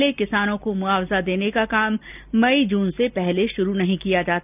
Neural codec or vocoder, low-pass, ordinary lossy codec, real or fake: none; 3.6 kHz; none; real